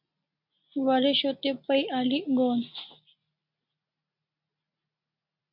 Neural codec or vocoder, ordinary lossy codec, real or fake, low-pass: none; MP3, 48 kbps; real; 5.4 kHz